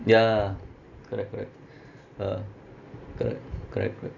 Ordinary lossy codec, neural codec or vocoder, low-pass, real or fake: none; none; 7.2 kHz; real